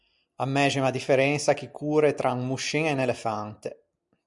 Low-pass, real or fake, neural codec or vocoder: 10.8 kHz; real; none